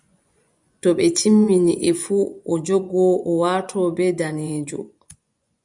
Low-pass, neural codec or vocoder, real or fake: 10.8 kHz; vocoder, 24 kHz, 100 mel bands, Vocos; fake